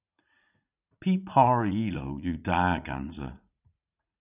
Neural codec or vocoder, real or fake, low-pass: vocoder, 44.1 kHz, 80 mel bands, Vocos; fake; 3.6 kHz